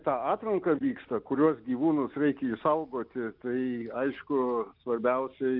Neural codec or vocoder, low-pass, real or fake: none; 5.4 kHz; real